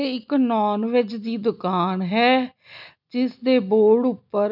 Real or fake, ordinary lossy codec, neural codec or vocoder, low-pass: real; none; none; 5.4 kHz